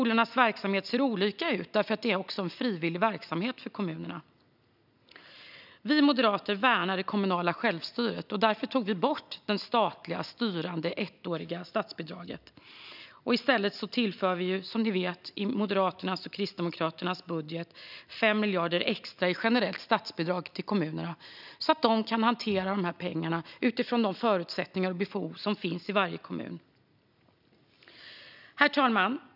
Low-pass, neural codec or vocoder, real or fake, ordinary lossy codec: 5.4 kHz; none; real; none